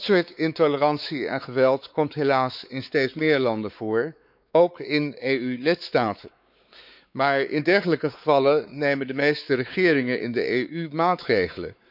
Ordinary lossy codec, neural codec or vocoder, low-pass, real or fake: none; codec, 16 kHz, 4 kbps, X-Codec, WavLM features, trained on Multilingual LibriSpeech; 5.4 kHz; fake